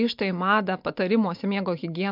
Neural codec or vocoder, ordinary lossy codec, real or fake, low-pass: none; MP3, 48 kbps; real; 5.4 kHz